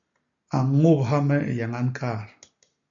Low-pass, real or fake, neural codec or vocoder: 7.2 kHz; real; none